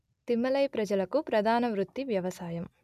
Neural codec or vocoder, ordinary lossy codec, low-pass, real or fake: none; none; 14.4 kHz; real